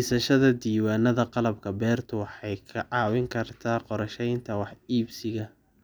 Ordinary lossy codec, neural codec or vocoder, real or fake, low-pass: none; none; real; none